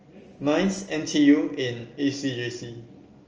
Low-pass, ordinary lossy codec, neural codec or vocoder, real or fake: 7.2 kHz; Opus, 24 kbps; none; real